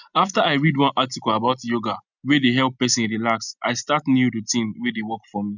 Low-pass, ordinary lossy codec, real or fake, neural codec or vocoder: 7.2 kHz; none; real; none